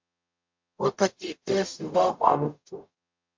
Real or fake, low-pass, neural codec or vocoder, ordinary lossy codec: fake; 7.2 kHz; codec, 44.1 kHz, 0.9 kbps, DAC; MP3, 48 kbps